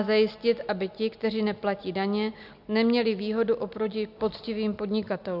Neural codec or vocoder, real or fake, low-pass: none; real; 5.4 kHz